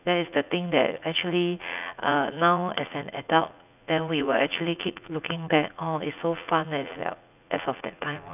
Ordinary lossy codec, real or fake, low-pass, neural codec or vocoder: none; fake; 3.6 kHz; vocoder, 44.1 kHz, 80 mel bands, Vocos